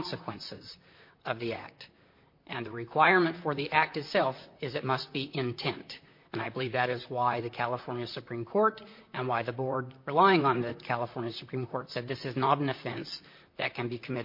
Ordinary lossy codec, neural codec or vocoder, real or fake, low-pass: MP3, 32 kbps; vocoder, 44.1 kHz, 128 mel bands, Pupu-Vocoder; fake; 5.4 kHz